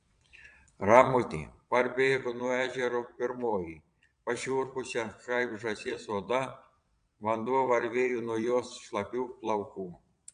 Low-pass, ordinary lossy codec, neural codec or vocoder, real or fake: 9.9 kHz; MP3, 64 kbps; vocoder, 22.05 kHz, 80 mel bands, Vocos; fake